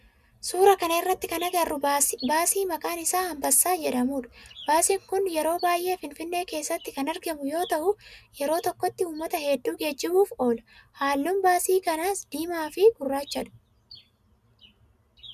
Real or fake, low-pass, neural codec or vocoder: real; 14.4 kHz; none